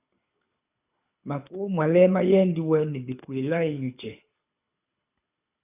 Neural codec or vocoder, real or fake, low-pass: codec, 24 kHz, 6 kbps, HILCodec; fake; 3.6 kHz